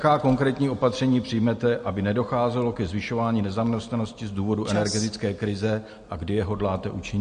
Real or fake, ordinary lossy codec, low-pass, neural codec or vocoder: real; MP3, 48 kbps; 9.9 kHz; none